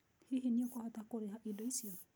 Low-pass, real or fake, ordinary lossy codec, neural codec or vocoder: none; real; none; none